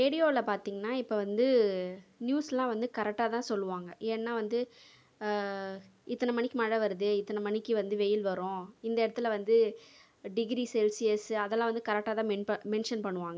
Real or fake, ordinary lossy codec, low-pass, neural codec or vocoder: real; none; none; none